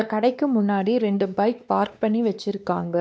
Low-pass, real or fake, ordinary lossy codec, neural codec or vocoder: none; fake; none; codec, 16 kHz, 2 kbps, X-Codec, WavLM features, trained on Multilingual LibriSpeech